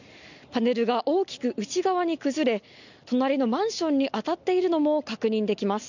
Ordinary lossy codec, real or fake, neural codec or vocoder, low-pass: none; real; none; 7.2 kHz